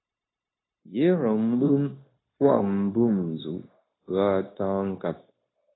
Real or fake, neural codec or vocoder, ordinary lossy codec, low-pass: fake; codec, 16 kHz, 0.9 kbps, LongCat-Audio-Codec; AAC, 16 kbps; 7.2 kHz